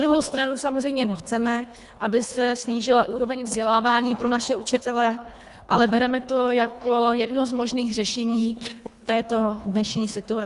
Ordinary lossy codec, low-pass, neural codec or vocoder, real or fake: Opus, 64 kbps; 10.8 kHz; codec, 24 kHz, 1.5 kbps, HILCodec; fake